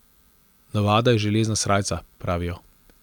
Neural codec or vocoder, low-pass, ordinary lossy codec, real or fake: none; 19.8 kHz; none; real